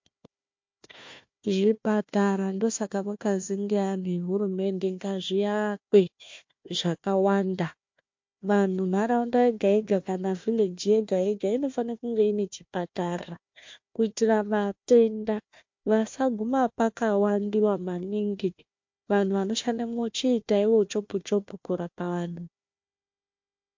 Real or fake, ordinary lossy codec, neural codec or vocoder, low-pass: fake; MP3, 48 kbps; codec, 16 kHz, 1 kbps, FunCodec, trained on Chinese and English, 50 frames a second; 7.2 kHz